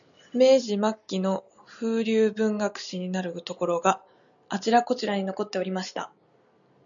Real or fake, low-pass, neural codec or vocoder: real; 7.2 kHz; none